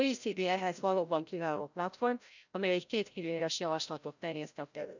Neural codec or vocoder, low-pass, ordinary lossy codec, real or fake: codec, 16 kHz, 0.5 kbps, FreqCodec, larger model; 7.2 kHz; none; fake